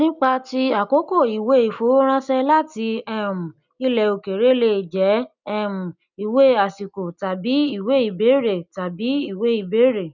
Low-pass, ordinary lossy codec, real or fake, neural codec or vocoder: 7.2 kHz; none; real; none